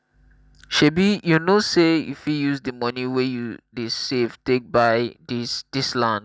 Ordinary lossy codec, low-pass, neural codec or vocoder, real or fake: none; none; none; real